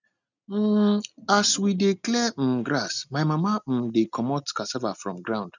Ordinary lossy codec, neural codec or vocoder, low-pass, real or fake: none; none; 7.2 kHz; real